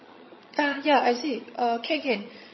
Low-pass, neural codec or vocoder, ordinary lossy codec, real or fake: 7.2 kHz; vocoder, 22.05 kHz, 80 mel bands, HiFi-GAN; MP3, 24 kbps; fake